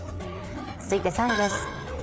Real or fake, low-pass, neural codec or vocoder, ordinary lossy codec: fake; none; codec, 16 kHz, 4 kbps, FreqCodec, larger model; none